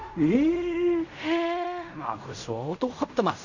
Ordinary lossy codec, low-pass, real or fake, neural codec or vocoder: none; 7.2 kHz; fake; codec, 16 kHz in and 24 kHz out, 0.4 kbps, LongCat-Audio-Codec, fine tuned four codebook decoder